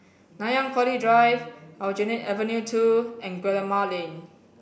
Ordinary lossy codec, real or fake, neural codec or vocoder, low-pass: none; real; none; none